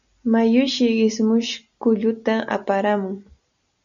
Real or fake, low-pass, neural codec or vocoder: real; 7.2 kHz; none